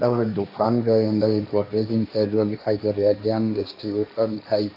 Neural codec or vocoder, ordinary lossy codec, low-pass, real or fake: codec, 16 kHz, 1.1 kbps, Voila-Tokenizer; AAC, 48 kbps; 5.4 kHz; fake